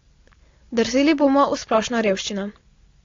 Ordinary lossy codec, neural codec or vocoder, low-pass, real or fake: AAC, 32 kbps; none; 7.2 kHz; real